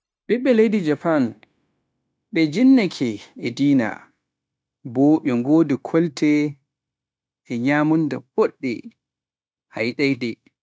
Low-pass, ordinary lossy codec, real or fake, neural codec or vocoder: none; none; fake; codec, 16 kHz, 0.9 kbps, LongCat-Audio-Codec